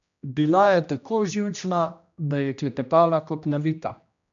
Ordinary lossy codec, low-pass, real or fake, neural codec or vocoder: none; 7.2 kHz; fake; codec, 16 kHz, 1 kbps, X-Codec, HuBERT features, trained on general audio